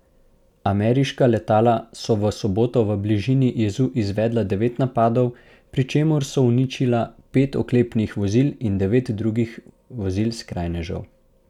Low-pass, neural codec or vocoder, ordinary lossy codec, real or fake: 19.8 kHz; none; none; real